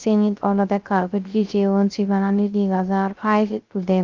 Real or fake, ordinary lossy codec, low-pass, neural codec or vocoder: fake; Opus, 32 kbps; 7.2 kHz; codec, 16 kHz, 0.3 kbps, FocalCodec